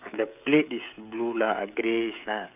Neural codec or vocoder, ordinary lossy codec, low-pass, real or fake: codec, 16 kHz, 16 kbps, FreqCodec, smaller model; none; 3.6 kHz; fake